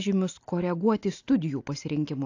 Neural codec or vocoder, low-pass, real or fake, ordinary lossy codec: none; 7.2 kHz; real; AAC, 48 kbps